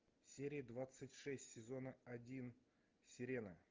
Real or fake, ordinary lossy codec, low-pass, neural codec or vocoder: real; Opus, 24 kbps; 7.2 kHz; none